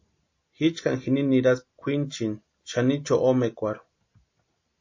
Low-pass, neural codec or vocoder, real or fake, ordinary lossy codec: 7.2 kHz; none; real; MP3, 32 kbps